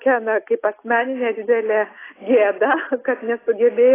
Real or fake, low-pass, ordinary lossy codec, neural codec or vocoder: real; 3.6 kHz; AAC, 16 kbps; none